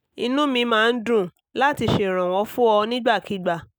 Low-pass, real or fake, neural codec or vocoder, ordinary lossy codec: none; real; none; none